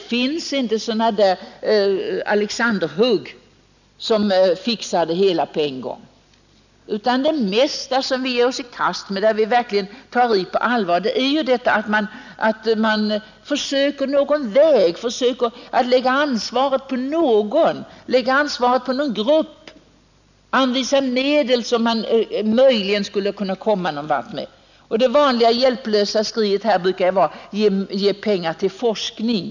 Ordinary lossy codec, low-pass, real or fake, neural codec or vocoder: none; 7.2 kHz; real; none